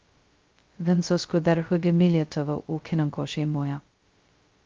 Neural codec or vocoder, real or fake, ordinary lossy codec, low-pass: codec, 16 kHz, 0.2 kbps, FocalCodec; fake; Opus, 32 kbps; 7.2 kHz